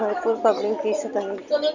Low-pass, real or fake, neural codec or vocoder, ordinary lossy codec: 7.2 kHz; real; none; none